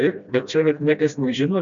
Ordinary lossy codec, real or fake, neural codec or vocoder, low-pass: MP3, 96 kbps; fake; codec, 16 kHz, 1 kbps, FreqCodec, smaller model; 7.2 kHz